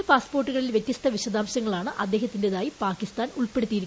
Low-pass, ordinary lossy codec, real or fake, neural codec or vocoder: none; none; real; none